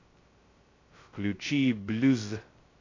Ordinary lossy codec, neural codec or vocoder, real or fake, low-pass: AAC, 32 kbps; codec, 16 kHz, 0.2 kbps, FocalCodec; fake; 7.2 kHz